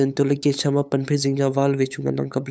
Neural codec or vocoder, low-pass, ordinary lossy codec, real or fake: codec, 16 kHz, 8 kbps, FunCodec, trained on LibriTTS, 25 frames a second; none; none; fake